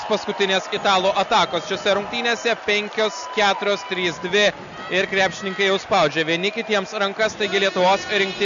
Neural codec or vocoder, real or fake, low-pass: none; real; 7.2 kHz